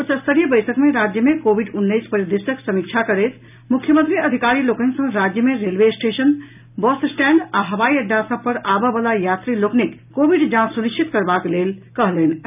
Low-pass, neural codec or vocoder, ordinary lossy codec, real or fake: 3.6 kHz; none; none; real